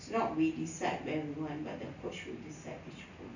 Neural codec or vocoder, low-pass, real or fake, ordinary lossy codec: none; 7.2 kHz; real; none